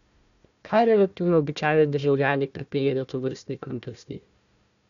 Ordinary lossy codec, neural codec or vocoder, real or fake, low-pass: none; codec, 16 kHz, 1 kbps, FunCodec, trained on Chinese and English, 50 frames a second; fake; 7.2 kHz